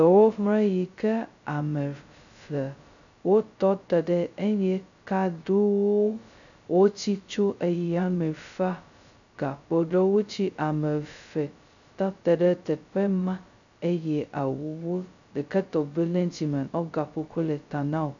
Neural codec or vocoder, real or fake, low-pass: codec, 16 kHz, 0.2 kbps, FocalCodec; fake; 7.2 kHz